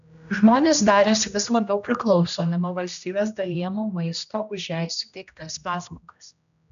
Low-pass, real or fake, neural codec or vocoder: 7.2 kHz; fake; codec, 16 kHz, 1 kbps, X-Codec, HuBERT features, trained on general audio